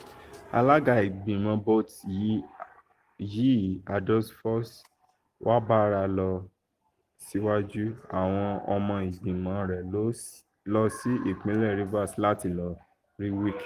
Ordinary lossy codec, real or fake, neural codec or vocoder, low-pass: Opus, 24 kbps; real; none; 14.4 kHz